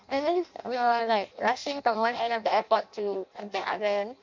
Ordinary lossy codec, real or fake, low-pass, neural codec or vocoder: MP3, 64 kbps; fake; 7.2 kHz; codec, 16 kHz in and 24 kHz out, 0.6 kbps, FireRedTTS-2 codec